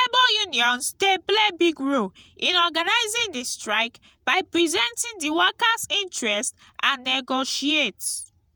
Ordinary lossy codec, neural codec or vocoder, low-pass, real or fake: none; vocoder, 48 kHz, 128 mel bands, Vocos; none; fake